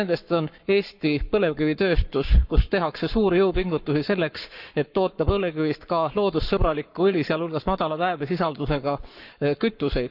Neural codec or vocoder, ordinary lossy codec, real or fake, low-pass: codec, 44.1 kHz, 7.8 kbps, Pupu-Codec; none; fake; 5.4 kHz